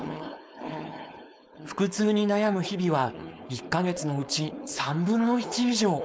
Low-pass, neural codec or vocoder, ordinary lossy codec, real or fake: none; codec, 16 kHz, 4.8 kbps, FACodec; none; fake